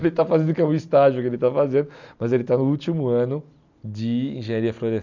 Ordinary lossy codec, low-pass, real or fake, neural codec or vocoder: none; 7.2 kHz; real; none